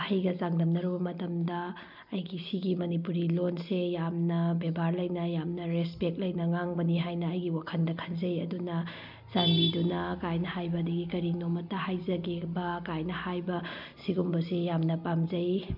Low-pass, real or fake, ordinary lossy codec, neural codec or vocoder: 5.4 kHz; real; none; none